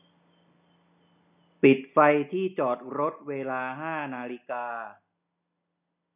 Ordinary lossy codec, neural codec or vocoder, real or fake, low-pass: none; none; real; 3.6 kHz